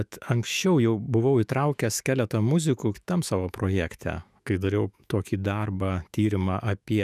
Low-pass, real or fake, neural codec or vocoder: 14.4 kHz; fake; autoencoder, 48 kHz, 128 numbers a frame, DAC-VAE, trained on Japanese speech